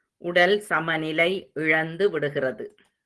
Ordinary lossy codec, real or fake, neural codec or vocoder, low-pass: Opus, 16 kbps; real; none; 10.8 kHz